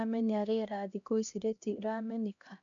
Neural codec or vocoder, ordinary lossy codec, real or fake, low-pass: codec, 16 kHz, 1 kbps, X-Codec, HuBERT features, trained on LibriSpeech; MP3, 64 kbps; fake; 7.2 kHz